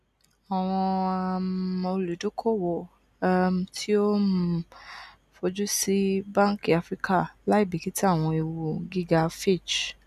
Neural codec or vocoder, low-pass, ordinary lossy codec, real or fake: none; 14.4 kHz; none; real